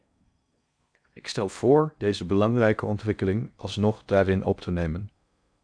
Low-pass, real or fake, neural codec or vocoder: 9.9 kHz; fake; codec, 16 kHz in and 24 kHz out, 0.6 kbps, FocalCodec, streaming, 4096 codes